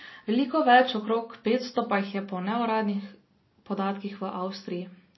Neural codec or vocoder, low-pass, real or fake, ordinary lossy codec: none; 7.2 kHz; real; MP3, 24 kbps